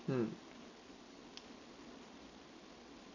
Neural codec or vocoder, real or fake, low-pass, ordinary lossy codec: none; real; 7.2 kHz; none